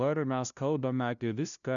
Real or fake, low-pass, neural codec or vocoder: fake; 7.2 kHz; codec, 16 kHz, 0.5 kbps, FunCodec, trained on LibriTTS, 25 frames a second